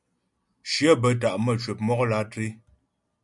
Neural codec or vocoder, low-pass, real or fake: none; 10.8 kHz; real